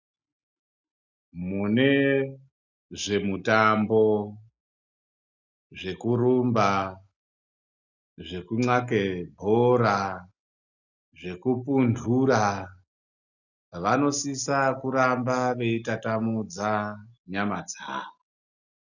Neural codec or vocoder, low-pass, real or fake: none; 7.2 kHz; real